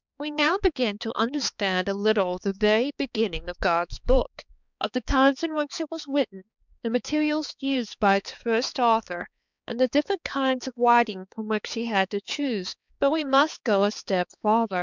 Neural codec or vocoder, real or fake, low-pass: codec, 16 kHz, 4 kbps, X-Codec, HuBERT features, trained on balanced general audio; fake; 7.2 kHz